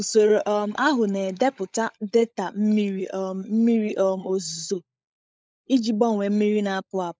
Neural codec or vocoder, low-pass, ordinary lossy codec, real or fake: codec, 16 kHz, 16 kbps, FunCodec, trained on LibriTTS, 50 frames a second; none; none; fake